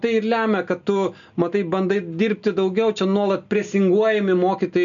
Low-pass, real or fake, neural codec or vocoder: 7.2 kHz; real; none